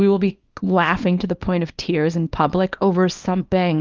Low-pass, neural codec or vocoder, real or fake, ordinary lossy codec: 7.2 kHz; codec, 24 kHz, 0.9 kbps, WavTokenizer, small release; fake; Opus, 32 kbps